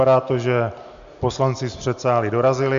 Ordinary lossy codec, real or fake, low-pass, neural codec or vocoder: MP3, 64 kbps; real; 7.2 kHz; none